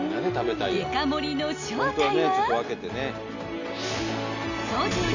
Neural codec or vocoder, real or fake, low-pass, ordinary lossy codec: none; real; 7.2 kHz; none